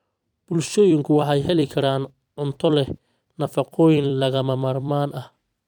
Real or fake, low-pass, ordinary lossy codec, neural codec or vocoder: fake; 19.8 kHz; none; vocoder, 44.1 kHz, 128 mel bands every 256 samples, BigVGAN v2